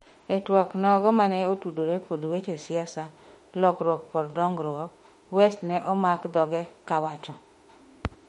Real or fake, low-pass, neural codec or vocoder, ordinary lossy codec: fake; 19.8 kHz; autoencoder, 48 kHz, 32 numbers a frame, DAC-VAE, trained on Japanese speech; MP3, 48 kbps